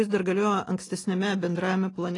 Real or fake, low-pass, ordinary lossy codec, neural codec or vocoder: real; 10.8 kHz; AAC, 32 kbps; none